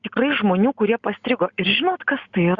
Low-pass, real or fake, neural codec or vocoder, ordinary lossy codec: 7.2 kHz; real; none; Opus, 64 kbps